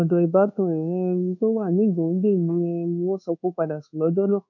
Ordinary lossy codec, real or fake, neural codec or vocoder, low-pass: none; fake; codec, 24 kHz, 1.2 kbps, DualCodec; 7.2 kHz